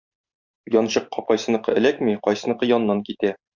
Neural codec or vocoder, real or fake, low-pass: vocoder, 44.1 kHz, 128 mel bands every 512 samples, BigVGAN v2; fake; 7.2 kHz